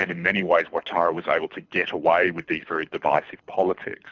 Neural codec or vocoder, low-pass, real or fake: codec, 24 kHz, 6 kbps, HILCodec; 7.2 kHz; fake